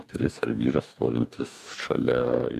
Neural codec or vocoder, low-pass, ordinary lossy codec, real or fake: codec, 44.1 kHz, 2.6 kbps, DAC; 14.4 kHz; AAC, 96 kbps; fake